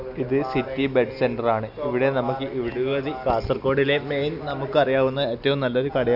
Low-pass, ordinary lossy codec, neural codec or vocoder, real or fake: 5.4 kHz; none; none; real